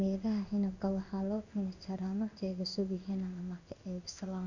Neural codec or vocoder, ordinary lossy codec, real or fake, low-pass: codec, 16 kHz in and 24 kHz out, 1 kbps, XY-Tokenizer; none; fake; 7.2 kHz